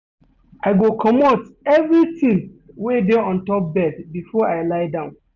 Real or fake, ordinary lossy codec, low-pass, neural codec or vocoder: real; none; 7.2 kHz; none